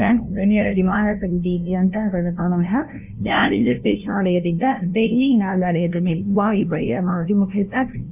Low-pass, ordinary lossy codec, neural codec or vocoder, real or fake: 3.6 kHz; none; codec, 16 kHz, 0.5 kbps, FunCodec, trained on LibriTTS, 25 frames a second; fake